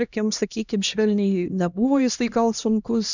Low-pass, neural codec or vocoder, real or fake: 7.2 kHz; codec, 16 kHz, 1 kbps, X-Codec, HuBERT features, trained on LibriSpeech; fake